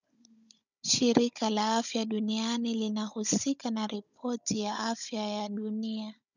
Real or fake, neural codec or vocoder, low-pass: fake; codec, 16 kHz, 16 kbps, FunCodec, trained on Chinese and English, 50 frames a second; 7.2 kHz